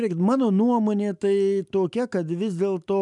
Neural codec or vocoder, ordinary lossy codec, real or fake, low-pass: none; MP3, 96 kbps; real; 10.8 kHz